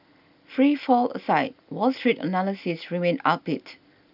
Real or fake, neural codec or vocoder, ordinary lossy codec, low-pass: real; none; none; 5.4 kHz